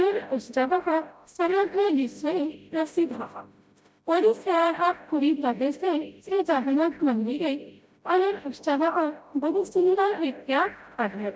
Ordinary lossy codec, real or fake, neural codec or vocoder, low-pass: none; fake; codec, 16 kHz, 0.5 kbps, FreqCodec, smaller model; none